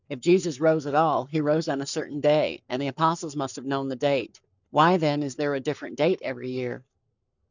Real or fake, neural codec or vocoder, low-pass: fake; codec, 44.1 kHz, 7.8 kbps, Pupu-Codec; 7.2 kHz